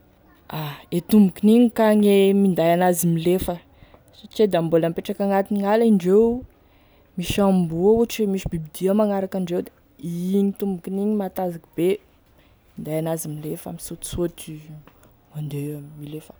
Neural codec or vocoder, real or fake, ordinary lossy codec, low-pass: none; real; none; none